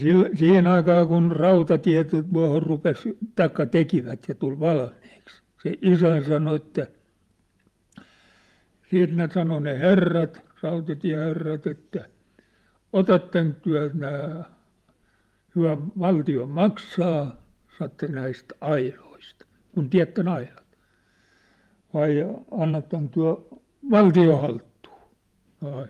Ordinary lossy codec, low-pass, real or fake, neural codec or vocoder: Opus, 32 kbps; 14.4 kHz; fake; vocoder, 48 kHz, 128 mel bands, Vocos